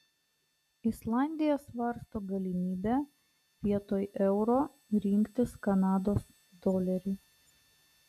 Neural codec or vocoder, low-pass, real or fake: none; 14.4 kHz; real